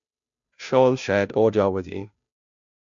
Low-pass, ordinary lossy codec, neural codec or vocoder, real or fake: 7.2 kHz; MP3, 64 kbps; codec, 16 kHz, 0.5 kbps, FunCodec, trained on Chinese and English, 25 frames a second; fake